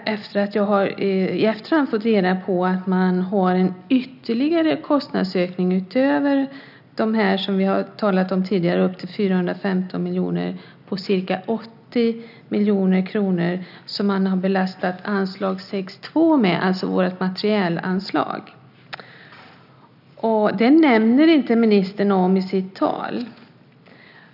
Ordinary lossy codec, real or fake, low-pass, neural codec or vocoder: none; real; 5.4 kHz; none